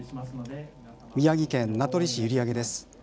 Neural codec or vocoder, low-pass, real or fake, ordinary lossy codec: none; none; real; none